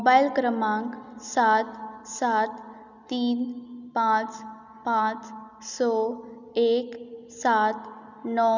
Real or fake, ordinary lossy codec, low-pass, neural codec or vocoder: real; none; 7.2 kHz; none